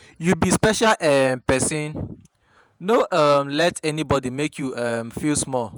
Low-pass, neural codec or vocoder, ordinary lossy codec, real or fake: none; vocoder, 48 kHz, 128 mel bands, Vocos; none; fake